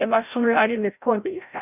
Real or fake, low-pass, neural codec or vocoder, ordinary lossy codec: fake; 3.6 kHz; codec, 16 kHz, 0.5 kbps, FreqCodec, larger model; none